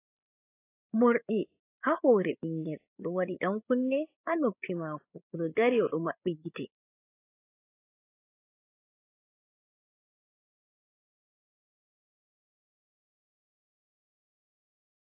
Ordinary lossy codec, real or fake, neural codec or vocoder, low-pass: AAC, 24 kbps; fake; codec, 16 kHz, 8 kbps, FreqCodec, larger model; 3.6 kHz